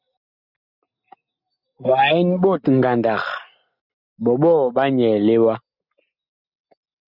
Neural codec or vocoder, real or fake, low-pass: none; real; 5.4 kHz